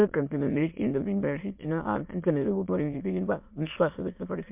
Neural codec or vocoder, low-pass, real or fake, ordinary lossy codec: autoencoder, 22.05 kHz, a latent of 192 numbers a frame, VITS, trained on many speakers; 3.6 kHz; fake; MP3, 32 kbps